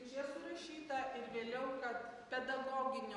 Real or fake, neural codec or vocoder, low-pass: real; none; 10.8 kHz